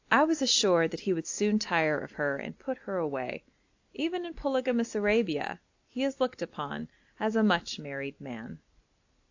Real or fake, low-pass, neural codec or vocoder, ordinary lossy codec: real; 7.2 kHz; none; AAC, 48 kbps